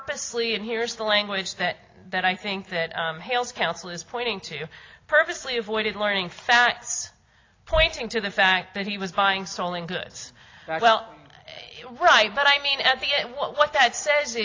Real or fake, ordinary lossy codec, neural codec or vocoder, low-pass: real; AAC, 32 kbps; none; 7.2 kHz